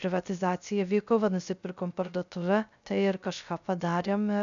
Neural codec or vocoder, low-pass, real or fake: codec, 16 kHz, 0.3 kbps, FocalCodec; 7.2 kHz; fake